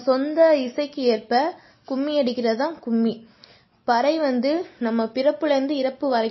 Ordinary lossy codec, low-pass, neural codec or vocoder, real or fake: MP3, 24 kbps; 7.2 kHz; none; real